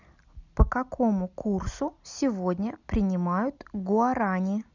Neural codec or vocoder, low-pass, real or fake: none; 7.2 kHz; real